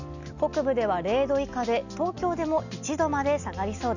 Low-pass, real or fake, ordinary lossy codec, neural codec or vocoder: 7.2 kHz; real; none; none